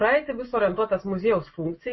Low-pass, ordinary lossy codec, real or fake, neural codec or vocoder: 7.2 kHz; MP3, 24 kbps; real; none